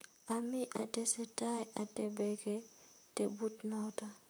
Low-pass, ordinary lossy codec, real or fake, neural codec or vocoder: none; none; fake; vocoder, 44.1 kHz, 128 mel bands, Pupu-Vocoder